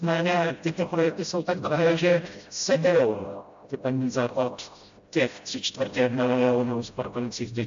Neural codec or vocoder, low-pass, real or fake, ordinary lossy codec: codec, 16 kHz, 0.5 kbps, FreqCodec, smaller model; 7.2 kHz; fake; AAC, 64 kbps